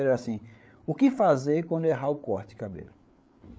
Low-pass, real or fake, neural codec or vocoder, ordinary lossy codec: none; fake; codec, 16 kHz, 8 kbps, FreqCodec, larger model; none